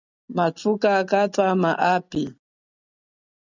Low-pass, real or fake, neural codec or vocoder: 7.2 kHz; real; none